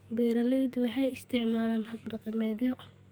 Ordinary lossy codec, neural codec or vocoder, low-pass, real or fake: none; codec, 44.1 kHz, 2.6 kbps, SNAC; none; fake